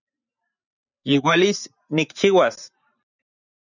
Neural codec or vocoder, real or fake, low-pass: vocoder, 44.1 kHz, 128 mel bands every 512 samples, BigVGAN v2; fake; 7.2 kHz